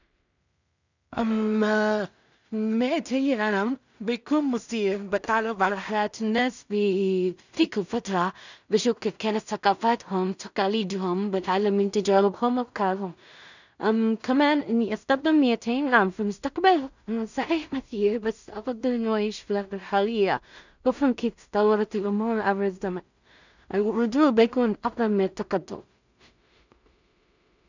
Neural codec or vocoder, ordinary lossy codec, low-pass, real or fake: codec, 16 kHz in and 24 kHz out, 0.4 kbps, LongCat-Audio-Codec, two codebook decoder; none; 7.2 kHz; fake